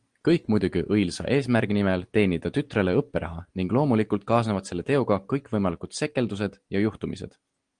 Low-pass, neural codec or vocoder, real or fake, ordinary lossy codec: 9.9 kHz; none; real; Opus, 24 kbps